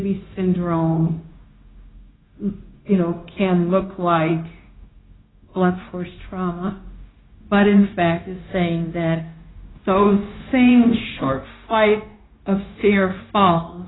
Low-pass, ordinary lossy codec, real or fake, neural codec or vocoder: 7.2 kHz; AAC, 16 kbps; fake; codec, 16 kHz, 0.9 kbps, LongCat-Audio-Codec